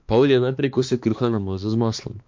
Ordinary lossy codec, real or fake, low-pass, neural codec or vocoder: MP3, 48 kbps; fake; 7.2 kHz; codec, 16 kHz, 2 kbps, X-Codec, HuBERT features, trained on balanced general audio